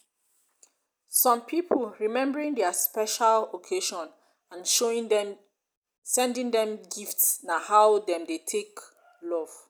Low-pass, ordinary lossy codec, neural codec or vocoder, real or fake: none; none; none; real